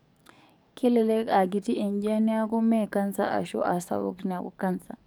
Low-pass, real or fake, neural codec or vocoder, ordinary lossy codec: none; fake; codec, 44.1 kHz, 7.8 kbps, DAC; none